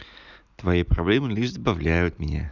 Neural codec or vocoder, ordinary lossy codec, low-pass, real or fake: none; none; 7.2 kHz; real